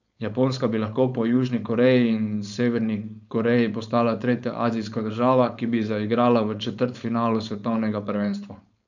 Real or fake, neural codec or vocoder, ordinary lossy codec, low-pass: fake; codec, 16 kHz, 4.8 kbps, FACodec; none; 7.2 kHz